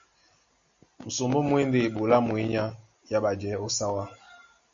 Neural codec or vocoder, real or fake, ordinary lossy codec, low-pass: none; real; Opus, 64 kbps; 7.2 kHz